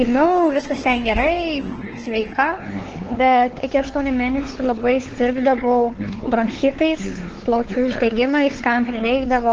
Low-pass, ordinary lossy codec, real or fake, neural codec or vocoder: 7.2 kHz; Opus, 16 kbps; fake; codec, 16 kHz, 4 kbps, X-Codec, WavLM features, trained on Multilingual LibriSpeech